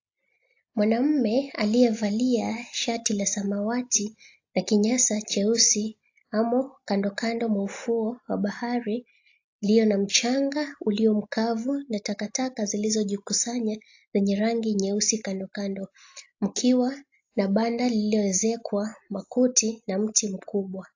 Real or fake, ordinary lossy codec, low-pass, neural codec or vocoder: real; AAC, 48 kbps; 7.2 kHz; none